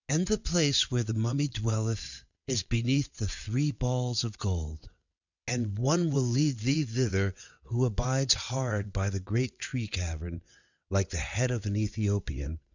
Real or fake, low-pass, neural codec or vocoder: fake; 7.2 kHz; vocoder, 22.05 kHz, 80 mel bands, WaveNeXt